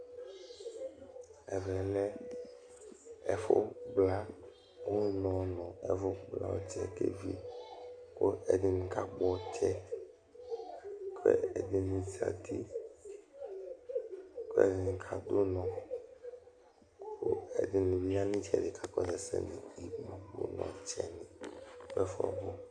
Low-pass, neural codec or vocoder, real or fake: 9.9 kHz; none; real